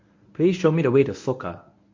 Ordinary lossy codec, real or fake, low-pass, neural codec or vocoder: MP3, 48 kbps; fake; 7.2 kHz; codec, 24 kHz, 0.9 kbps, WavTokenizer, medium speech release version 1